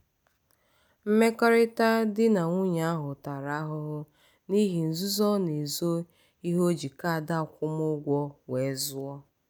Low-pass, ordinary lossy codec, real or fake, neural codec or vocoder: none; none; real; none